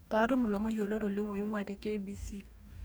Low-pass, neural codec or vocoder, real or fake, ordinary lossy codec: none; codec, 44.1 kHz, 2.6 kbps, DAC; fake; none